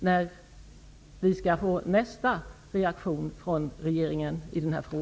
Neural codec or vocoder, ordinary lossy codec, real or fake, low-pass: none; none; real; none